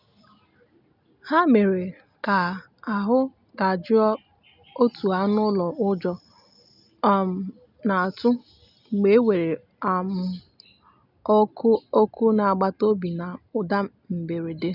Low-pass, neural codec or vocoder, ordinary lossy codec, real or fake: 5.4 kHz; none; none; real